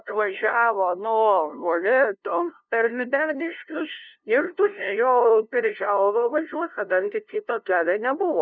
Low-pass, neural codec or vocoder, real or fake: 7.2 kHz; codec, 16 kHz, 0.5 kbps, FunCodec, trained on LibriTTS, 25 frames a second; fake